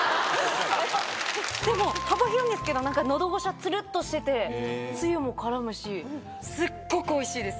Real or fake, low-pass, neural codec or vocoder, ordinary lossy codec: real; none; none; none